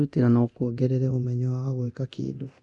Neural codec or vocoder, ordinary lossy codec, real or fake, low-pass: codec, 24 kHz, 0.9 kbps, DualCodec; none; fake; 10.8 kHz